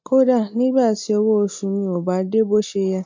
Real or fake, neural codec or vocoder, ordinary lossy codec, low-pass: real; none; MP3, 48 kbps; 7.2 kHz